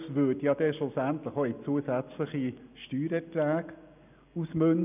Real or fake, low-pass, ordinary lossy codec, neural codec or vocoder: real; 3.6 kHz; none; none